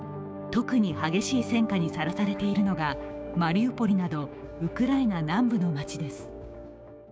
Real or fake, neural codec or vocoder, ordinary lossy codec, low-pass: fake; codec, 16 kHz, 6 kbps, DAC; none; none